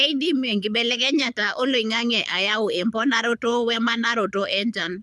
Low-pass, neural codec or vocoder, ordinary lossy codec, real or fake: none; codec, 24 kHz, 6 kbps, HILCodec; none; fake